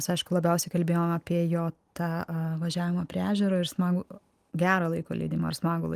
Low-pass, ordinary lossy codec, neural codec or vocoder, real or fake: 14.4 kHz; Opus, 24 kbps; none; real